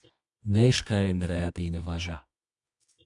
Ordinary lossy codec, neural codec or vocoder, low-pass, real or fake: MP3, 96 kbps; codec, 24 kHz, 0.9 kbps, WavTokenizer, medium music audio release; 10.8 kHz; fake